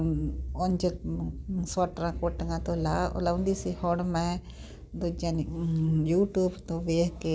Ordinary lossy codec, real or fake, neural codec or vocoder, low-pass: none; real; none; none